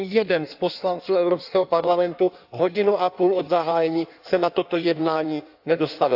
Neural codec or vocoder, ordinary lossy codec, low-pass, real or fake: codec, 16 kHz in and 24 kHz out, 1.1 kbps, FireRedTTS-2 codec; AAC, 48 kbps; 5.4 kHz; fake